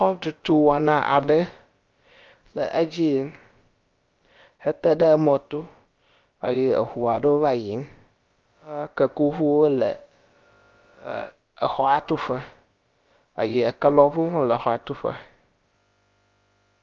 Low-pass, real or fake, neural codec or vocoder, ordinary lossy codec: 7.2 kHz; fake; codec, 16 kHz, about 1 kbps, DyCAST, with the encoder's durations; Opus, 24 kbps